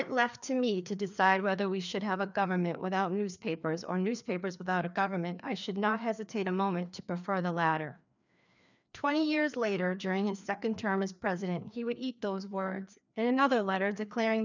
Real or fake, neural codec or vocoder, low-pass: fake; codec, 16 kHz, 2 kbps, FreqCodec, larger model; 7.2 kHz